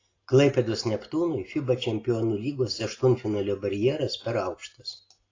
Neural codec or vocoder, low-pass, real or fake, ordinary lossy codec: none; 7.2 kHz; real; AAC, 32 kbps